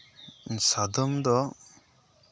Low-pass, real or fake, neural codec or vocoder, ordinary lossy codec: none; real; none; none